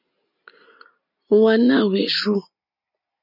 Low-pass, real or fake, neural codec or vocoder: 5.4 kHz; real; none